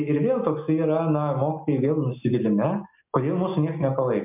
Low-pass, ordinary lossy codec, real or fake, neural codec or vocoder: 3.6 kHz; MP3, 32 kbps; real; none